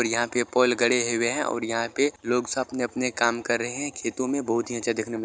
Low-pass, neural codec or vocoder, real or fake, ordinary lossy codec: none; none; real; none